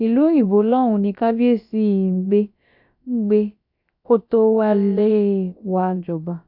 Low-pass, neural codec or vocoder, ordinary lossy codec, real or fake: 5.4 kHz; codec, 16 kHz, about 1 kbps, DyCAST, with the encoder's durations; none; fake